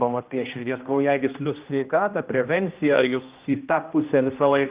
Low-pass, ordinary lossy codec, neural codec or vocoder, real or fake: 3.6 kHz; Opus, 32 kbps; codec, 16 kHz, 1 kbps, X-Codec, HuBERT features, trained on general audio; fake